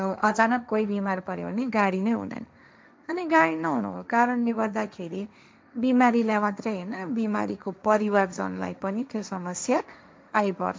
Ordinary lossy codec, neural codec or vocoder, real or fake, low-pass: none; codec, 16 kHz, 1.1 kbps, Voila-Tokenizer; fake; none